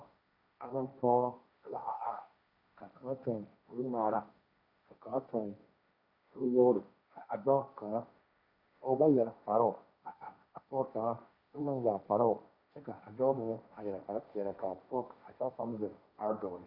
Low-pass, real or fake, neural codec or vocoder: 5.4 kHz; fake; codec, 16 kHz, 1.1 kbps, Voila-Tokenizer